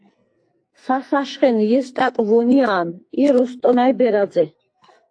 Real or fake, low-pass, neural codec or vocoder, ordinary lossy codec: fake; 9.9 kHz; codec, 44.1 kHz, 2.6 kbps, SNAC; AAC, 48 kbps